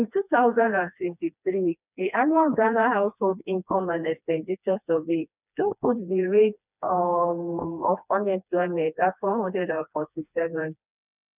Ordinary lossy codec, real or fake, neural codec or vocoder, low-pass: none; fake; codec, 16 kHz, 2 kbps, FreqCodec, smaller model; 3.6 kHz